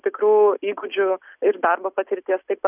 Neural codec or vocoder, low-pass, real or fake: none; 3.6 kHz; real